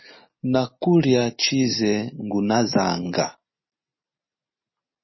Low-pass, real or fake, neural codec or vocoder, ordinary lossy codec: 7.2 kHz; real; none; MP3, 24 kbps